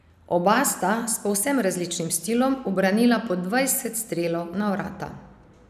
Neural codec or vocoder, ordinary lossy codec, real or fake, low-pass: none; none; real; 14.4 kHz